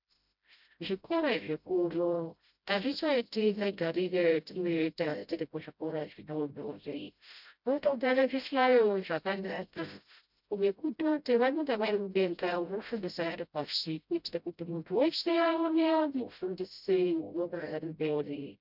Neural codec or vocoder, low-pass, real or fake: codec, 16 kHz, 0.5 kbps, FreqCodec, smaller model; 5.4 kHz; fake